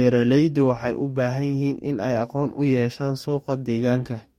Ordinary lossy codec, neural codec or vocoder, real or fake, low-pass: MP3, 64 kbps; codec, 44.1 kHz, 2.6 kbps, DAC; fake; 19.8 kHz